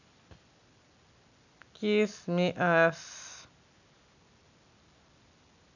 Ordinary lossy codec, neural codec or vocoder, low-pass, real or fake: none; none; 7.2 kHz; real